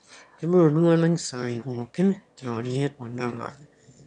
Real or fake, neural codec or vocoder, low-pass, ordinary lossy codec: fake; autoencoder, 22.05 kHz, a latent of 192 numbers a frame, VITS, trained on one speaker; 9.9 kHz; none